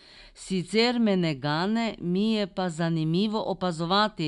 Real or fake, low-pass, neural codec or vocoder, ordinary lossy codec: real; 10.8 kHz; none; none